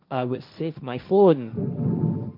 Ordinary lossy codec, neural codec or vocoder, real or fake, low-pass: MP3, 48 kbps; codec, 16 kHz, 1.1 kbps, Voila-Tokenizer; fake; 5.4 kHz